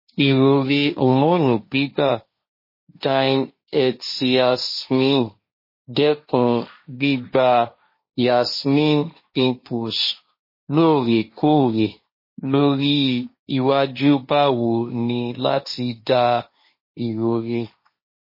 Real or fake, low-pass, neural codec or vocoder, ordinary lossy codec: fake; 5.4 kHz; codec, 16 kHz, 1.1 kbps, Voila-Tokenizer; MP3, 24 kbps